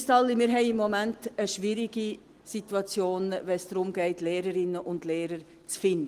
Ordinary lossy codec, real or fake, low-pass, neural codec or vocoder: Opus, 24 kbps; real; 14.4 kHz; none